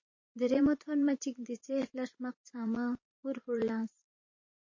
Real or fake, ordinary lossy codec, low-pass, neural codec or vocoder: fake; MP3, 32 kbps; 7.2 kHz; vocoder, 44.1 kHz, 128 mel bands every 512 samples, BigVGAN v2